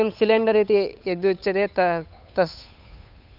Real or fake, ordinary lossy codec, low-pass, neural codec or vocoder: fake; none; 5.4 kHz; codec, 16 kHz, 8 kbps, FunCodec, trained on Chinese and English, 25 frames a second